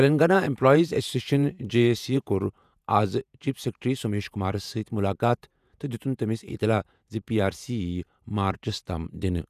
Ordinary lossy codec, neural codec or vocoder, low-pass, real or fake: none; vocoder, 48 kHz, 128 mel bands, Vocos; 14.4 kHz; fake